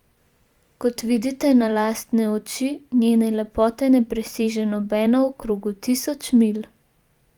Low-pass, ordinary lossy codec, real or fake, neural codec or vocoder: 19.8 kHz; Opus, 32 kbps; fake; vocoder, 44.1 kHz, 128 mel bands every 512 samples, BigVGAN v2